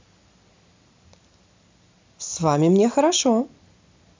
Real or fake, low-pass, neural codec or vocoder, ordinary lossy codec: real; 7.2 kHz; none; MP3, 64 kbps